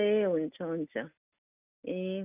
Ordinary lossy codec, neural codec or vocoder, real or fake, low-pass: none; none; real; 3.6 kHz